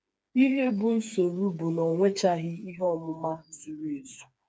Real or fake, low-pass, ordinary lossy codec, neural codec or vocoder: fake; none; none; codec, 16 kHz, 4 kbps, FreqCodec, smaller model